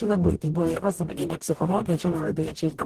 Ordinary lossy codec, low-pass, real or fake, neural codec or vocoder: Opus, 16 kbps; 14.4 kHz; fake; codec, 44.1 kHz, 0.9 kbps, DAC